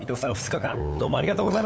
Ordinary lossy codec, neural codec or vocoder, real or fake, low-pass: none; codec, 16 kHz, 8 kbps, FunCodec, trained on LibriTTS, 25 frames a second; fake; none